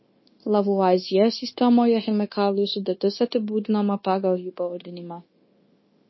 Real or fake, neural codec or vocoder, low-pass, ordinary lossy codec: fake; codec, 16 kHz, 0.9 kbps, LongCat-Audio-Codec; 7.2 kHz; MP3, 24 kbps